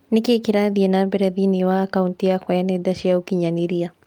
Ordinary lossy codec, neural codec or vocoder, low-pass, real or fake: Opus, 32 kbps; none; 19.8 kHz; real